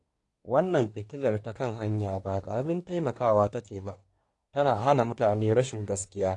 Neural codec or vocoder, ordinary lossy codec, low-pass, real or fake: codec, 24 kHz, 1 kbps, SNAC; AAC, 48 kbps; 10.8 kHz; fake